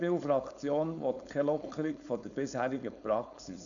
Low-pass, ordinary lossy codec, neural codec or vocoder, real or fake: 7.2 kHz; none; codec, 16 kHz, 4.8 kbps, FACodec; fake